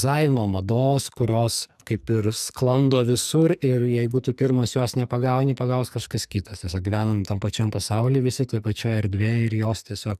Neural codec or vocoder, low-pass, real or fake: codec, 44.1 kHz, 2.6 kbps, SNAC; 14.4 kHz; fake